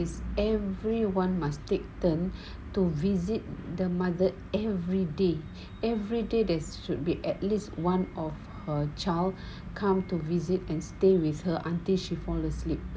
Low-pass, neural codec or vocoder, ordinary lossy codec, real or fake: none; none; none; real